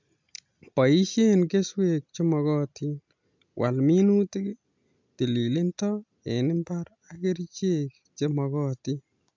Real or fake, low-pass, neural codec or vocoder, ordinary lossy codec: real; 7.2 kHz; none; MP3, 64 kbps